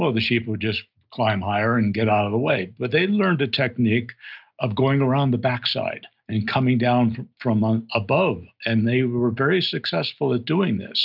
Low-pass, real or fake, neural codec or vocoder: 5.4 kHz; real; none